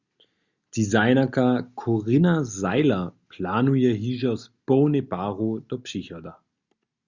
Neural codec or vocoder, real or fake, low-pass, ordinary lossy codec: none; real; 7.2 kHz; Opus, 64 kbps